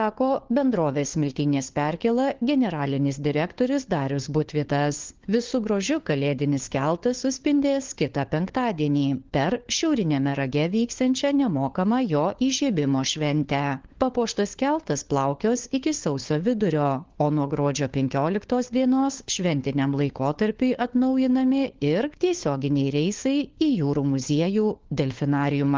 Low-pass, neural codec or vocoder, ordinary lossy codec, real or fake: 7.2 kHz; codec, 16 kHz, 4 kbps, FunCodec, trained on LibriTTS, 50 frames a second; Opus, 16 kbps; fake